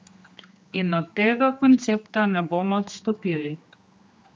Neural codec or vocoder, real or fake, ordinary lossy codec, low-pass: codec, 16 kHz, 2 kbps, X-Codec, HuBERT features, trained on general audio; fake; none; none